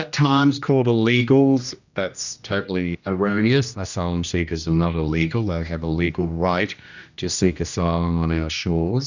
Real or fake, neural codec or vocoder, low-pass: fake; codec, 16 kHz, 1 kbps, X-Codec, HuBERT features, trained on general audio; 7.2 kHz